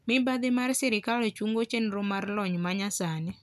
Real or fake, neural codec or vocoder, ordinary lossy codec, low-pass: real; none; none; 14.4 kHz